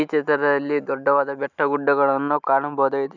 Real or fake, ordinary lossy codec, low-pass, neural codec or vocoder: real; none; 7.2 kHz; none